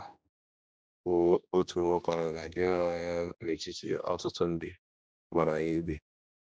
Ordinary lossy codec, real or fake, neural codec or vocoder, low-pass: none; fake; codec, 16 kHz, 1 kbps, X-Codec, HuBERT features, trained on general audio; none